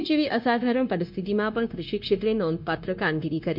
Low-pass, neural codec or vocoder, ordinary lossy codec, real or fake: 5.4 kHz; codec, 16 kHz, 0.9 kbps, LongCat-Audio-Codec; MP3, 48 kbps; fake